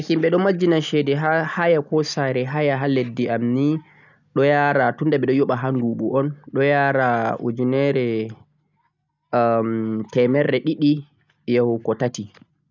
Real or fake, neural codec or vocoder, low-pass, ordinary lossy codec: real; none; 7.2 kHz; none